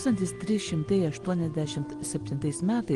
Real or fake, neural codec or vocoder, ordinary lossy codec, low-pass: real; none; Opus, 24 kbps; 10.8 kHz